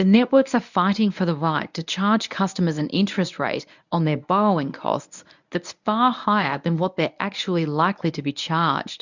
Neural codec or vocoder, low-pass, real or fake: codec, 24 kHz, 0.9 kbps, WavTokenizer, medium speech release version 1; 7.2 kHz; fake